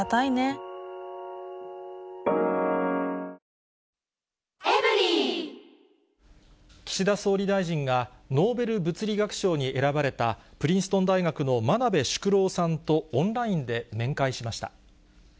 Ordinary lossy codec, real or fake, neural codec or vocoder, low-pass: none; real; none; none